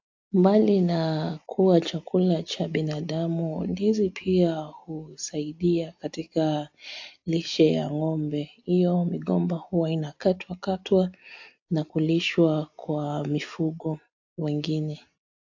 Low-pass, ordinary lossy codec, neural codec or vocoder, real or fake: 7.2 kHz; AAC, 48 kbps; none; real